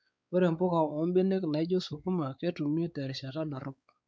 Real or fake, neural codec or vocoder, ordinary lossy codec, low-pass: fake; codec, 16 kHz, 4 kbps, X-Codec, WavLM features, trained on Multilingual LibriSpeech; none; none